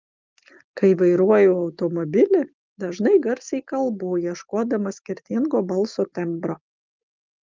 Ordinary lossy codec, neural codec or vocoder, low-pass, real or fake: Opus, 24 kbps; none; 7.2 kHz; real